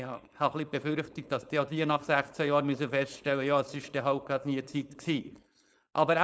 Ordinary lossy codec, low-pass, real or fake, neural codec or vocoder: none; none; fake; codec, 16 kHz, 4.8 kbps, FACodec